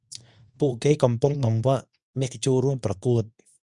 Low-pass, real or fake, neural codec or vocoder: 10.8 kHz; fake; codec, 24 kHz, 0.9 kbps, WavTokenizer, medium speech release version 2